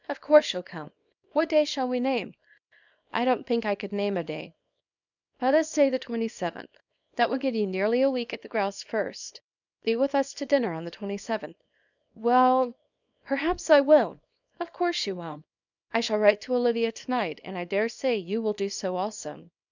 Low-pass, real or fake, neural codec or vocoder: 7.2 kHz; fake; codec, 24 kHz, 0.9 kbps, WavTokenizer, small release